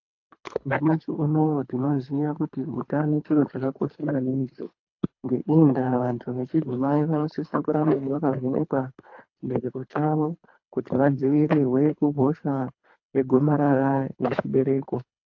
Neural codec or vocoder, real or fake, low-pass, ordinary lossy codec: codec, 24 kHz, 3 kbps, HILCodec; fake; 7.2 kHz; AAC, 48 kbps